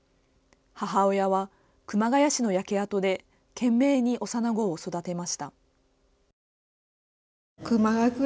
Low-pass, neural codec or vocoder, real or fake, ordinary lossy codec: none; none; real; none